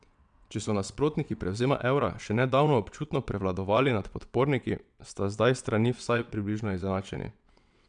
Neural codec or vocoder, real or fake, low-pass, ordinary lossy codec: vocoder, 22.05 kHz, 80 mel bands, WaveNeXt; fake; 9.9 kHz; none